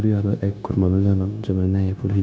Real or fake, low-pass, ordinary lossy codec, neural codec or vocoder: fake; none; none; codec, 16 kHz, 0.9 kbps, LongCat-Audio-Codec